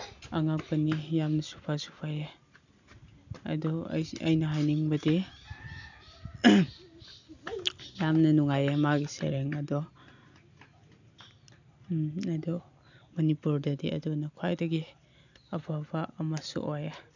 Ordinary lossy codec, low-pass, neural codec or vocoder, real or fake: none; 7.2 kHz; none; real